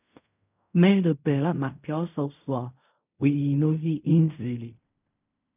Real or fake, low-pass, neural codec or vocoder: fake; 3.6 kHz; codec, 16 kHz in and 24 kHz out, 0.4 kbps, LongCat-Audio-Codec, fine tuned four codebook decoder